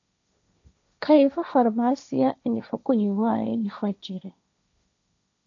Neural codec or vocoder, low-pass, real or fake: codec, 16 kHz, 1.1 kbps, Voila-Tokenizer; 7.2 kHz; fake